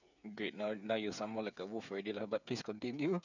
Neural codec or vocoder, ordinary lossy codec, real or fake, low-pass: codec, 16 kHz, 8 kbps, FreqCodec, smaller model; none; fake; 7.2 kHz